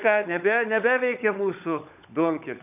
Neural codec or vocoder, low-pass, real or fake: codec, 16 kHz, 16 kbps, FunCodec, trained on LibriTTS, 50 frames a second; 3.6 kHz; fake